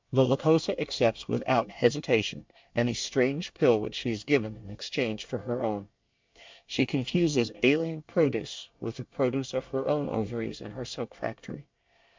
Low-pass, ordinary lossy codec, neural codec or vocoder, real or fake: 7.2 kHz; MP3, 64 kbps; codec, 24 kHz, 1 kbps, SNAC; fake